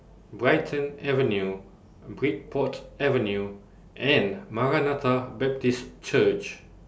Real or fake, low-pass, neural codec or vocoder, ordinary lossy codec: real; none; none; none